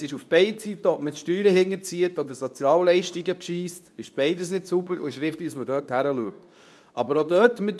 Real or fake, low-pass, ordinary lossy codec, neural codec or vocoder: fake; none; none; codec, 24 kHz, 0.9 kbps, WavTokenizer, medium speech release version 2